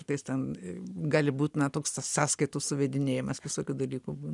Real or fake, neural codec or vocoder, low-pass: real; none; 10.8 kHz